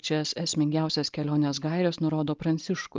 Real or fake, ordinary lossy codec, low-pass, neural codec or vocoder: real; Opus, 24 kbps; 7.2 kHz; none